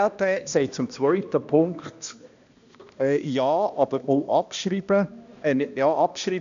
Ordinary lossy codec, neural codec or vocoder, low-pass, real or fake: AAC, 96 kbps; codec, 16 kHz, 1 kbps, X-Codec, HuBERT features, trained on balanced general audio; 7.2 kHz; fake